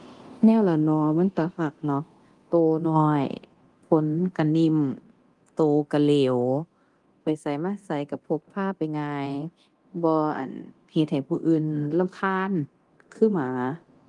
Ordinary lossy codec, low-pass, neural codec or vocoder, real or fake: Opus, 24 kbps; 10.8 kHz; codec, 24 kHz, 0.9 kbps, DualCodec; fake